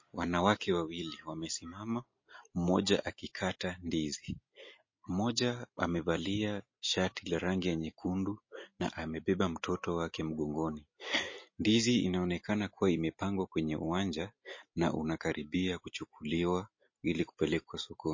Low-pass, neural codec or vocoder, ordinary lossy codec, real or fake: 7.2 kHz; none; MP3, 32 kbps; real